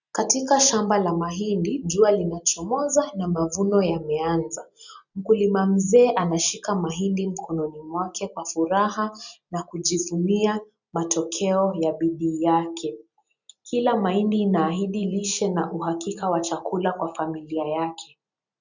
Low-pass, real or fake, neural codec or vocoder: 7.2 kHz; real; none